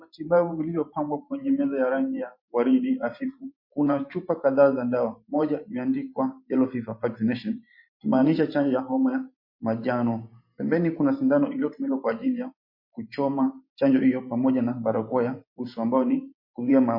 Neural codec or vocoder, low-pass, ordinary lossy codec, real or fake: vocoder, 44.1 kHz, 128 mel bands every 512 samples, BigVGAN v2; 5.4 kHz; MP3, 32 kbps; fake